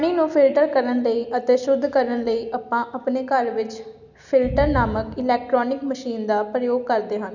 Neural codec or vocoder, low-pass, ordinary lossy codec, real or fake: none; 7.2 kHz; none; real